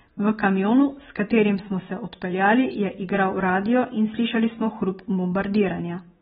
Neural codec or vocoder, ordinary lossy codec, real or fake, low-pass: none; AAC, 16 kbps; real; 19.8 kHz